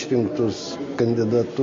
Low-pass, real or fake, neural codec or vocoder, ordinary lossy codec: 7.2 kHz; real; none; MP3, 48 kbps